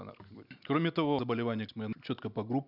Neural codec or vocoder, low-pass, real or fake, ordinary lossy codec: none; 5.4 kHz; real; none